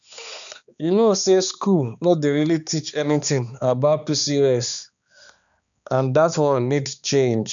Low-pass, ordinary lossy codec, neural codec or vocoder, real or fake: 7.2 kHz; none; codec, 16 kHz, 2 kbps, X-Codec, HuBERT features, trained on balanced general audio; fake